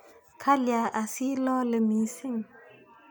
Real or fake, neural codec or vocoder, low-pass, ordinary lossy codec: real; none; none; none